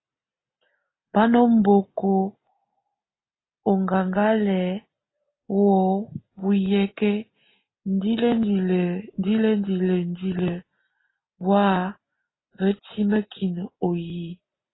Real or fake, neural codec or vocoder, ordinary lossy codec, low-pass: real; none; AAC, 16 kbps; 7.2 kHz